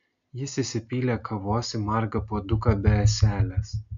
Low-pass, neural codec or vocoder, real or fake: 7.2 kHz; none; real